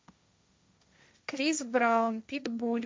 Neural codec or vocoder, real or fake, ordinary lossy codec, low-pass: codec, 16 kHz, 1.1 kbps, Voila-Tokenizer; fake; none; none